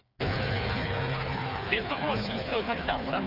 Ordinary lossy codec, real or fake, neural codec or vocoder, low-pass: none; fake; codec, 16 kHz, 4 kbps, FreqCodec, smaller model; 5.4 kHz